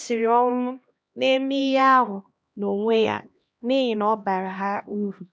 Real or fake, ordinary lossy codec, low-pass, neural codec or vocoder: fake; none; none; codec, 16 kHz, 1 kbps, X-Codec, HuBERT features, trained on LibriSpeech